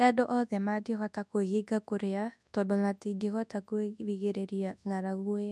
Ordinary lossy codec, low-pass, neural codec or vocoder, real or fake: none; none; codec, 24 kHz, 0.9 kbps, WavTokenizer, large speech release; fake